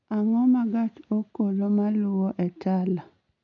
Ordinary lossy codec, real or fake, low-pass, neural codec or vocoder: none; real; 7.2 kHz; none